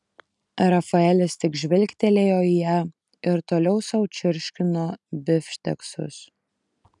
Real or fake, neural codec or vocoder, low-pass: real; none; 10.8 kHz